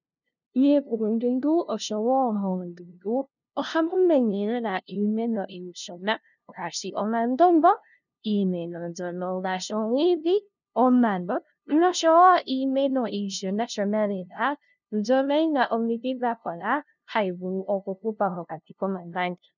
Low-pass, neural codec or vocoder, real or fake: 7.2 kHz; codec, 16 kHz, 0.5 kbps, FunCodec, trained on LibriTTS, 25 frames a second; fake